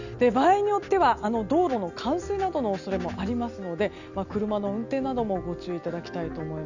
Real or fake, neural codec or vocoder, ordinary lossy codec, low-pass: real; none; none; 7.2 kHz